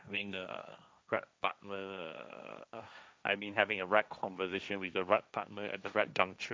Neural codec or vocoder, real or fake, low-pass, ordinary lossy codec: codec, 16 kHz, 1.1 kbps, Voila-Tokenizer; fake; none; none